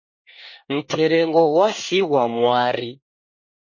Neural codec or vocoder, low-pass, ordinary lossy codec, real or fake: codec, 24 kHz, 1 kbps, SNAC; 7.2 kHz; MP3, 32 kbps; fake